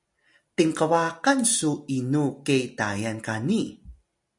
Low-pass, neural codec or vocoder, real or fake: 10.8 kHz; none; real